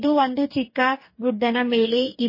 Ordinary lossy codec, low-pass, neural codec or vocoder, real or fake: MP3, 24 kbps; 5.4 kHz; codec, 44.1 kHz, 2.6 kbps, DAC; fake